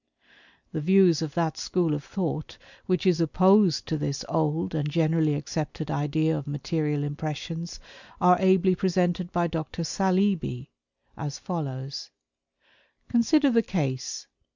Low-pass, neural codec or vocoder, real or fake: 7.2 kHz; none; real